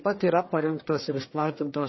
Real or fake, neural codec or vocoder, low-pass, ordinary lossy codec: fake; codec, 44.1 kHz, 1.7 kbps, Pupu-Codec; 7.2 kHz; MP3, 24 kbps